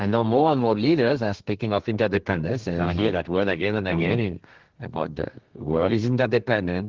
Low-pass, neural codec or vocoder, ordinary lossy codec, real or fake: 7.2 kHz; codec, 32 kHz, 1.9 kbps, SNAC; Opus, 16 kbps; fake